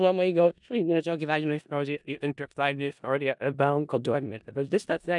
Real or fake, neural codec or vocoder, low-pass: fake; codec, 16 kHz in and 24 kHz out, 0.4 kbps, LongCat-Audio-Codec, four codebook decoder; 10.8 kHz